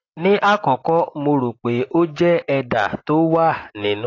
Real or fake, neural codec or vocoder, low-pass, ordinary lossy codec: real; none; 7.2 kHz; AAC, 32 kbps